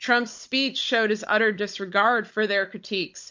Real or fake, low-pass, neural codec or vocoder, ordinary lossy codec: fake; 7.2 kHz; codec, 16 kHz, 16 kbps, FunCodec, trained on Chinese and English, 50 frames a second; MP3, 48 kbps